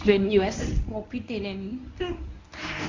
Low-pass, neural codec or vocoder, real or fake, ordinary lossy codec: 7.2 kHz; codec, 24 kHz, 0.9 kbps, WavTokenizer, medium speech release version 1; fake; Opus, 64 kbps